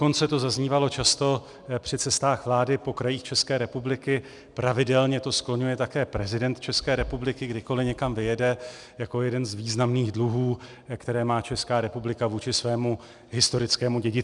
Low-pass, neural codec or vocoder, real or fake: 10.8 kHz; none; real